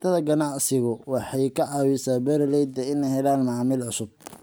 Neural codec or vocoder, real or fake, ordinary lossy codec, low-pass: none; real; none; none